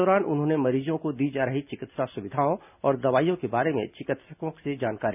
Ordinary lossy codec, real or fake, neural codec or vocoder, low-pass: none; real; none; 3.6 kHz